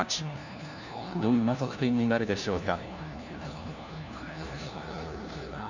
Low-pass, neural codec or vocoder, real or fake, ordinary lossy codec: 7.2 kHz; codec, 16 kHz, 1 kbps, FunCodec, trained on LibriTTS, 50 frames a second; fake; none